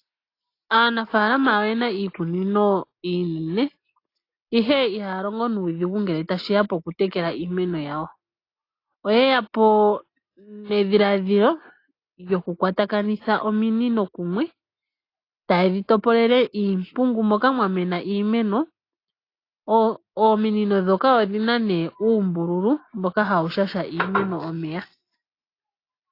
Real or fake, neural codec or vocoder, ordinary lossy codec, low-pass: real; none; AAC, 32 kbps; 5.4 kHz